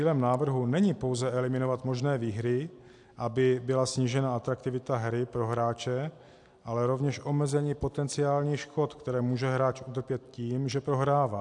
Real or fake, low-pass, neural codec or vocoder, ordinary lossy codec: real; 10.8 kHz; none; AAC, 64 kbps